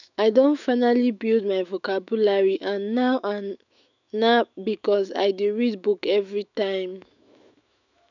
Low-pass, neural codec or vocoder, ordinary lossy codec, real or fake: 7.2 kHz; autoencoder, 48 kHz, 128 numbers a frame, DAC-VAE, trained on Japanese speech; none; fake